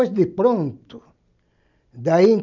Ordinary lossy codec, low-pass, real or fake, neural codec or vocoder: none; 7.2 kHz; real; none